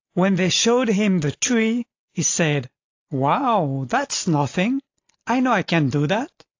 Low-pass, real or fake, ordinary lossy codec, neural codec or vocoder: 7.2 kHz; real; AAC, 48 kbps; none